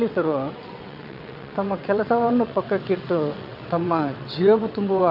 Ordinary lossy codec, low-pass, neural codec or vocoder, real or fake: none; 5.4 kHz; vocoder, 22.05 kHz, 80 mel bands, WaveNeXt; fake